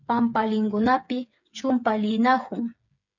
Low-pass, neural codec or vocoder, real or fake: 7.2 kHz; codec, 16 kHz, 8 kbps, FreqCodec, smaller model; fake